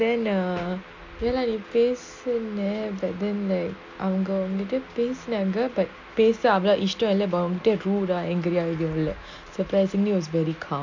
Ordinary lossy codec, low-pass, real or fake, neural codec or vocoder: MP3, 48 kbps; 7.2 kHz; real; none